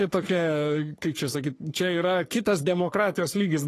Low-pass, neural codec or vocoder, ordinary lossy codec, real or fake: 14.4 kHz; codec, 44.1 kHz, 3.4 kbps, Pupu-Codec; AAC, 48 kbps; fake